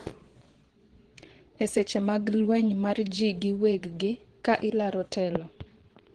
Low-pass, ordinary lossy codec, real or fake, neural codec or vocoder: 9.9 kHz; Opus, 16 kbps; fake; vocoder, 22.05 kHz, 80 mel bands, Vocos